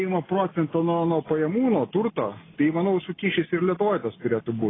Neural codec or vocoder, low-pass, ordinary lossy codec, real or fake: none; 7.2 kHz; AAC, 16 kbps; real